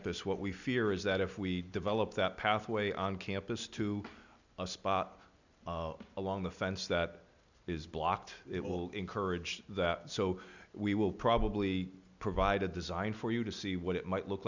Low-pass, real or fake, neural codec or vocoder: 7.2 kHz; real; none